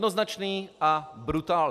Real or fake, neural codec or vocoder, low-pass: real; none; 14.4 kHz